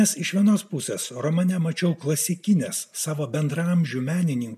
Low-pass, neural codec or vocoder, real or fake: 14.4 kHz; none; real